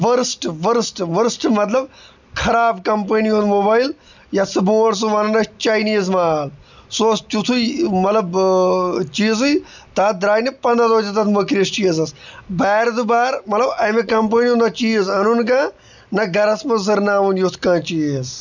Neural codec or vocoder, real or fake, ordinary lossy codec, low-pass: none; real; none; 7.2 kHz